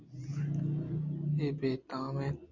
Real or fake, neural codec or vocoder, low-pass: real; none; 7.2 kHz